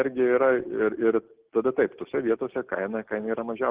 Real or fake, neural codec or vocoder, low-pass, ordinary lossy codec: real; none; 3.6 kHz; Opus, 16 kbps